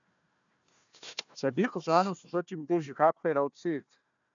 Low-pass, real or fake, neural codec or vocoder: 7.2 kHz; fake; codec, 16 kHz, 1 kbps, FunCodec, trained on Chinese and English, 50 frames a second